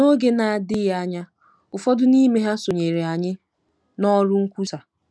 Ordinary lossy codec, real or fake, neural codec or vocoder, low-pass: none; real; none; none